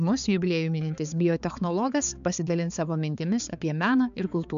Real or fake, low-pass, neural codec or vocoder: fake; 7.2 kHz; codec, 16 kHz, 4 kbps, X-Codec, HuBERT features, trained on balanced general audio